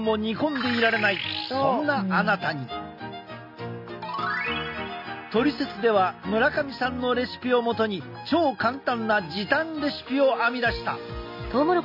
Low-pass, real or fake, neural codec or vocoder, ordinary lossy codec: 5.4 kHz; real; none; none